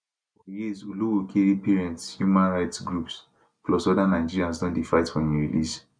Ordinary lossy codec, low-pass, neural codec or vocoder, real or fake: none; 9.9 kHz; none; real